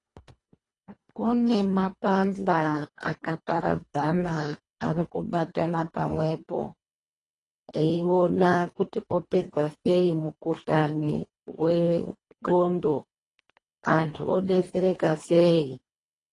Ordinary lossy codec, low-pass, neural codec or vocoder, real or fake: AAC, 32 kbps; 10.8 kHz; codec, 24 kHz, 1.5 kbps, HILCodec; fake